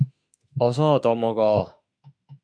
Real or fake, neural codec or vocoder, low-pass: fake; autoencoder, 48 kHz, 32 numbers a frame, DAC-VAE, trained on Japanese speech; 9.9 kHz